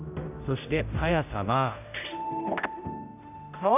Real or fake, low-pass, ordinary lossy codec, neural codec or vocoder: fake; 3.6 kHz; none; codec, 16 kHz, 0.5 kbps, X-Codec, HuBERT features, trained on general audio